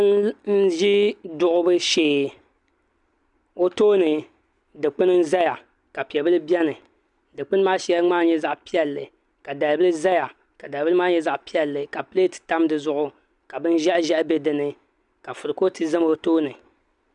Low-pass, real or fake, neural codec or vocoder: 10.8 kHz; real; none